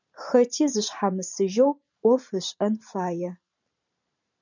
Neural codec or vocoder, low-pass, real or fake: vocoder, 44.1 kHz, 128 mel bands every 512 samples, BigVGAN v2; 7.2 kHz; fake